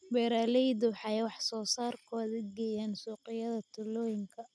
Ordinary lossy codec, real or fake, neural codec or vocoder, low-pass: none; real; none; none